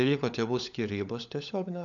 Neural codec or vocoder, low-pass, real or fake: codec, 16 kHz, 16 kbps, FunCodec, trained on LibriTTS, 50 frames a second; 7.2 kHz; fake